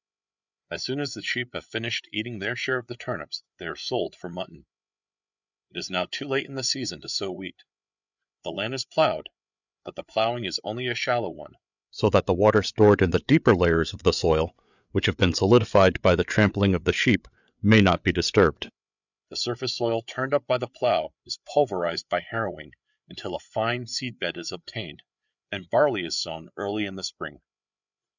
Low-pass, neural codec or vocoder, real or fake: 7.2 kHz; codec, 16 kHz, 8 kbps, FreqCodec, larger model; fake